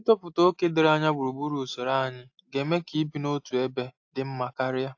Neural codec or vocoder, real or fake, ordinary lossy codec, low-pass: none; real; AAC, 48 kbps; 7.2 kHz